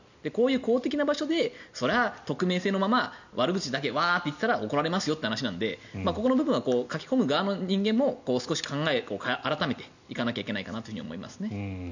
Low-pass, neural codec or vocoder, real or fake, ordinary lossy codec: 7.2 kHz; none; real; none